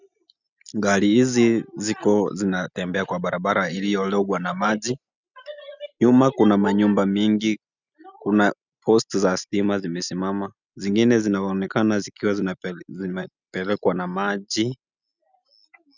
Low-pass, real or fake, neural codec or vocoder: 7.2 kHz; real; none